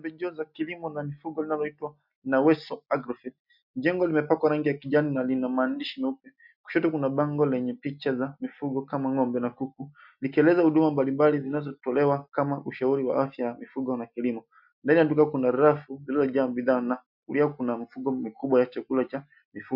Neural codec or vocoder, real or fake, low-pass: none; real; 5.4 kHz